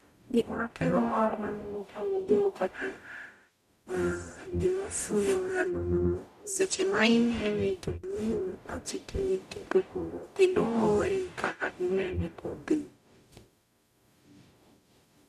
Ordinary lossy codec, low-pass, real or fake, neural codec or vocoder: none; 14.4 kHz; fake; codec, 44.1 kHz, 0.9 kbps, DAC